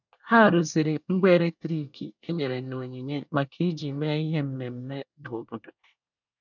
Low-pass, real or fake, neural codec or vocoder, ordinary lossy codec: 7.2 kHz; fake; codec, 24 kHz, 1 kbps, SNAC; none